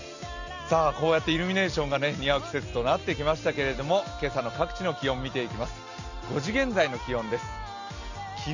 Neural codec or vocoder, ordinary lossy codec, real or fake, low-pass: none; none; real; 7.2 kHz